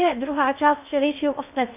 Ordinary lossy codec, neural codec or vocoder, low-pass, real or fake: MP3, 32 kbps; codec, 16 kHz in and 24 kHz out, 0.6 kbps, FocalCodec, streaming, 2048 codes; 3.6 kHz; fake